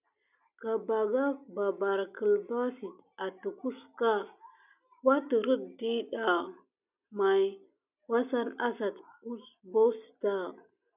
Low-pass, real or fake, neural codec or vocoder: 3.6 kHz; real; none